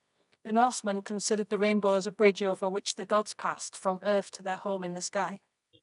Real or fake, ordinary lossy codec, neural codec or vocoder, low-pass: fake; none; codec, 24 kHz, 0.9 kbps, WavTokenizer, medium music audio release; 10.8 kHz